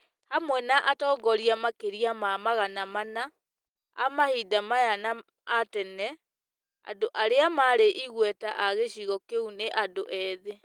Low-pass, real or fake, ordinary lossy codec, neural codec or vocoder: 19.8 kHz; real; Opus, 24 kbps; none